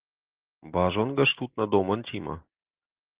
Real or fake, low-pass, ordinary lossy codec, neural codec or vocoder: real; 3.6 kHz; Opus, 16 kbps; none